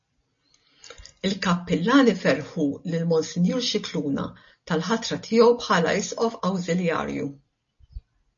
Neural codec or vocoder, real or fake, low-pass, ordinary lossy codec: none; real; 7.2 kHz; MP3, 32 kbps